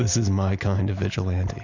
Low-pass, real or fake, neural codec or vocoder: 7.2 kHz; real; none